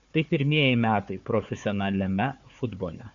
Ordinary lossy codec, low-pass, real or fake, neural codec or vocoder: MP3, 64 kbps; 7.2 kHz; fake; codec, 16 kHz, 4 kbps, FunCodec, trained on Chinese and English, 50 frames a second